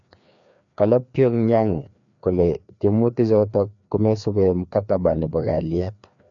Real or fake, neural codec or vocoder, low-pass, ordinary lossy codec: fake; codec, 16 kHz, 2 kbps, FreqCodec, larger model; 7.2 kHz; none